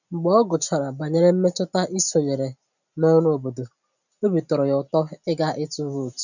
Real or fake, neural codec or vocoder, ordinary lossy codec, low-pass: real; none; none; 7.2 kHz